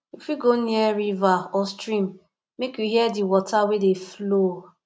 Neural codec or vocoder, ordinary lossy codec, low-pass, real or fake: none; none; none; real